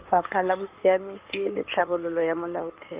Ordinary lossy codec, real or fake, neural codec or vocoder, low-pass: Opus, 32 kbps; fake; codec, 16 kHz in and 24 kHz out, 2.2 kbps, FireRedTTS-2 codec; 3.6 kHz